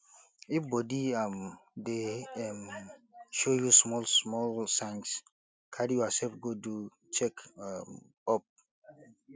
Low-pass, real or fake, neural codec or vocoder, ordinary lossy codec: none; real; none; none